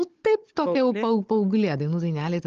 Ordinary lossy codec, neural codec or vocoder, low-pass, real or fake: Opus, 16 kbps; codec, 16 kHz, 16 kbps, FunCodec, trained on Chinese and English, 50 frames a second; 7.2 kHz; fake